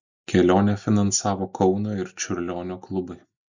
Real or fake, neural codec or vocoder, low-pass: real; none; 7.2 kHz